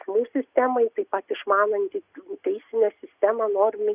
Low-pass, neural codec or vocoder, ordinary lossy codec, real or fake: 3.6 kHz; none; Opus, 64 kbps; real